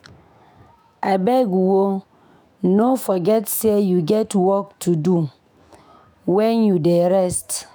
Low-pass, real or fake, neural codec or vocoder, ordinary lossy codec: none; fake; autoencoder, 48 kHz, 128 numbers a frame, DAC-VAE, trained on Japanese speech; none